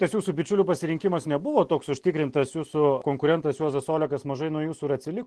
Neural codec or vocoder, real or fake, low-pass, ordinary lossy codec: none; real; 10.8 kHz; Opus, 16 kbps